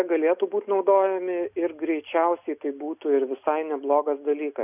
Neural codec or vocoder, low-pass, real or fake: none; 3.6 kHz; real